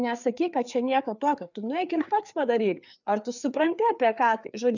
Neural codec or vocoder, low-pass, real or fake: codec, 16 kHz, 4 kbps, FreqCodec, larger model; 7.2 kHz; fake